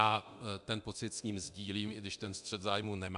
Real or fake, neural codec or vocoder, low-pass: fake; codec, 24 kHz, 0.9 kbps, DualCodec; 10.8 kHz